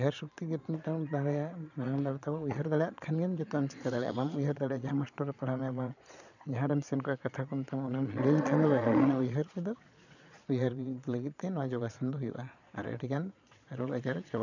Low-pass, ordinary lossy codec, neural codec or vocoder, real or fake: 7.2 kHz; none; vocoder, 22.05 kHz, 80 mel bands, Vocos; fake